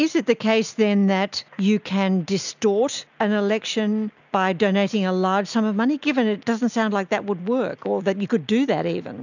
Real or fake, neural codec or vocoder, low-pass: real; none; 7.2 kHz